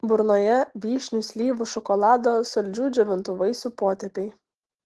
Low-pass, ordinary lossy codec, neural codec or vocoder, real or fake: 10.8 kHz; Opus, 16 kbps; none; real